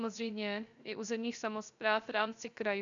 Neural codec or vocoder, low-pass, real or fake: codec, 16 kHz, 0.3 kbps, FocalCodec; 7.2 kHz; fake